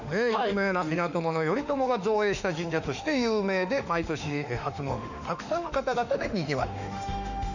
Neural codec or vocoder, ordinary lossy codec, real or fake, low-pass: autoencoder, 48 kHz, 32 numbers a frame, DAC-VAE, trained on Japanese speech; none; fake; 7.2 kHz